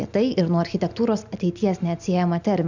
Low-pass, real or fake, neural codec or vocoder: 7.2 kHz; real; none